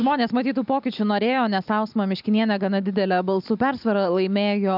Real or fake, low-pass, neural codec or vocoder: real; 5.4 kHz; none